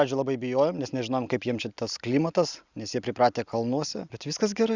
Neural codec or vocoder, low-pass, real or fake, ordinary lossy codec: none; 7.2 kHz; real; Opus, 64 kbps